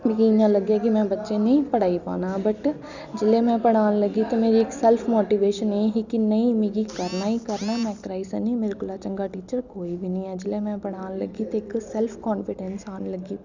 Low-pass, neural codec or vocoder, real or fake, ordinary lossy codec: 7.2 kHz; none; real; none